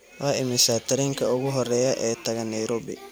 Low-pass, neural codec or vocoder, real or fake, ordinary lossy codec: none; vocoder, 44.1 kHz, 128 mel bands every 512 samples, BigVGAN v2; fake; none